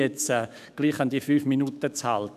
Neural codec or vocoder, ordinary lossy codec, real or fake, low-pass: autoencoder, 48 kHz, 128 numbers a frame, DAC-VAE, trained on Japanese speech; none; fake; 14.4 kHz